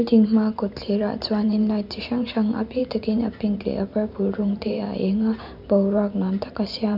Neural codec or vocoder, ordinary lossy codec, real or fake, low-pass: vocoder, 44.1 kHz, 128 mel bands every 512 samples, BigVGAN v2; none; fake; 5.4 kHz